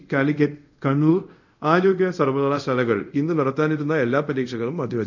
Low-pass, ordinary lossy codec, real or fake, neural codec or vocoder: 7.2 kHz; none; fake; codec, 24 kHz, 0.5 kbps, DualCodec